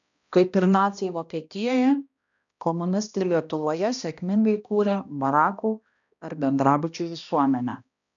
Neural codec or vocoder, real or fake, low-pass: codec, 16 kHz, 1 kbps, X-Codec, HuBERT features, trained on balanced general audio; fake; 7.2 kHz